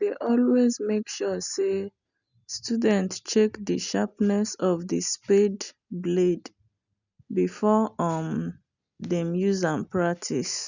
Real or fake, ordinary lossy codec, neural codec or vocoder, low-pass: real; none; none; 7.2 kHz